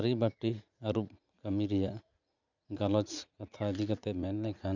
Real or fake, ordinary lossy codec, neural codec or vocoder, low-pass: fake; none; vocoder, 44.1 kHz, 80 mel bands, Vocos; 7.2 kHz